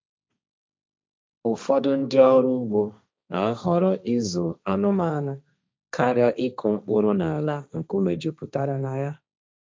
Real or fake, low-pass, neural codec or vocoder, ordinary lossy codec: fake; none; codec, 16 kHz, 1.1 kbps, Voila-Tokenizer; none